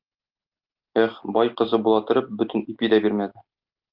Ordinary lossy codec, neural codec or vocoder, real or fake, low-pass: Opus, 24 kbps; none; real; 5.4 kHz